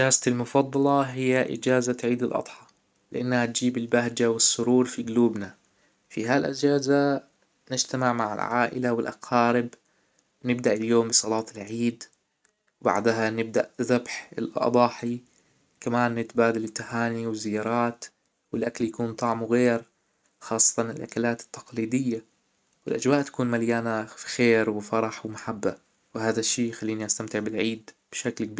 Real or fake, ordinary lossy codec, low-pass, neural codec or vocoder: real; none; none; none